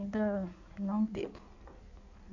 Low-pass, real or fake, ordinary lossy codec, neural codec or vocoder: 7.2 kHz; fake; none; codec, 16 kHz in and 24 kHz out, 1.1 kbps, FireRedTTS-2 codec